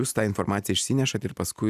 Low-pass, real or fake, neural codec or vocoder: 14.4 kHz; real; none